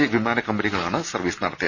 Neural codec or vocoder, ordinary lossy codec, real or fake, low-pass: none; Opus, 64 kbps; real; 7.2 kHz